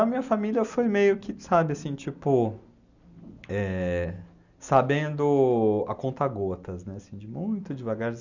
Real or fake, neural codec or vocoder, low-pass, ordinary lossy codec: real; none; 7.2 kHz; none